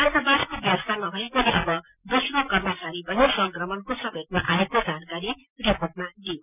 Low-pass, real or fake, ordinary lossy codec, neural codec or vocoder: 3.6 kHz; real; none; none